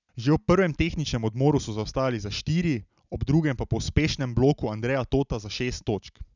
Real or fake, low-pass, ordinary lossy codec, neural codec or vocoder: real; 7.2 kHz; none; none